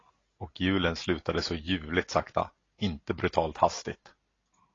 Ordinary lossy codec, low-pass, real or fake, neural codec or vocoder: AAC, 32 kbps; 7.2 kHz; real; none